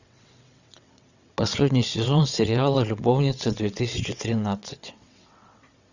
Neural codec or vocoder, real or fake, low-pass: vocoder, 22.05 kHz, 80 mel bands, WaveNeXt; fake; 7.2 kHz